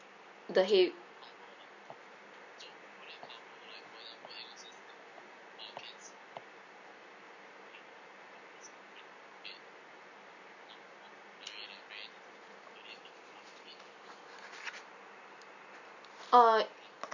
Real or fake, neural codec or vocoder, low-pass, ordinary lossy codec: real; none; 7.2 kHz; none